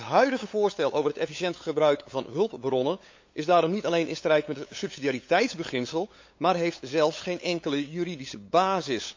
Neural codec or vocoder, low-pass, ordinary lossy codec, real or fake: codec, 16 kHz, 8 kbps, FunCodec, trained on LibriTTS, 25 frames a second; 7.2 kHz; MP3, 48 kbps; fake